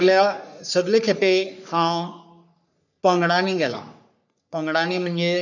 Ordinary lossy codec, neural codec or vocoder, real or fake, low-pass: none; codec, 44.1 kHz, 3.4 kbps, Pupu-Codec; fake; 7.2 kHz